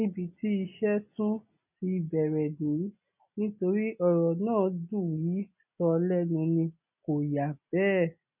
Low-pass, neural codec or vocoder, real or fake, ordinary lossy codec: 3.6 kHz; none; real; none